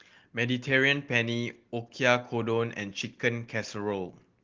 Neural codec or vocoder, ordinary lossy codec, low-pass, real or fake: none; Opus, 16 kbps; 7.2 kHz; real